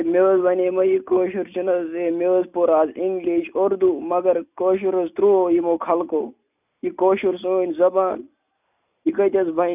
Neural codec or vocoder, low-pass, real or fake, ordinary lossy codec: none; 3.6 kHz; real; none